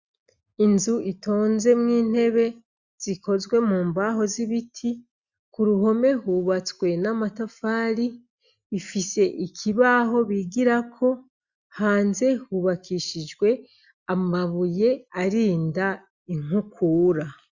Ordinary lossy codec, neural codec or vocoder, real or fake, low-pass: Opus, 64 kbps; none; real; 7.2 kHz